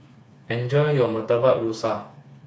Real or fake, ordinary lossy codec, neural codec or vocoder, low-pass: fake; none; codec, 16 kHz, 4 kbps, FreqCodec, smaller model; none